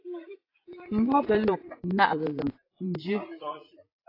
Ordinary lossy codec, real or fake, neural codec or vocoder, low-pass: AAC, 24 kbps; fake; vocoder, 44.1 kHz, 80 mel bands, Vocos; 5.4 kHz